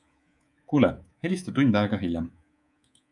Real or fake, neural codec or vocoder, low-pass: fake; codec, 24 kHz, 3.1 kbps, DualCodec; 10.8 kHz